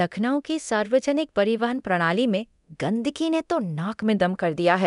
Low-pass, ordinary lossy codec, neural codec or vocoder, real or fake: 10.8 kHz; none; codec, 24 kHz, 0.9 kbps, DualCodec; fake